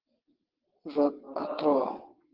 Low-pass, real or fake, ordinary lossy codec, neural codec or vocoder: 5.4 kHz; fake; Opus, 16 kbps; vocoder, 22.05 kHz, 80 mel bands, WaveNeXt